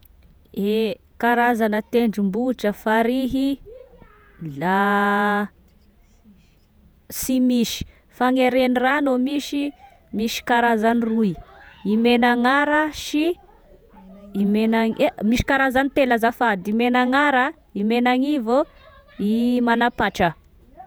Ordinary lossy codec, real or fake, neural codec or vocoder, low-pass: none; fake; vocoder, 48 kHz, 128 mel bands, Vocos; none